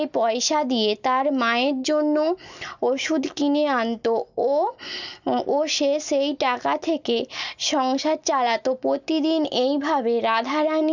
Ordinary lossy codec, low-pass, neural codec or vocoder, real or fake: none; 7.2 kHz; none; real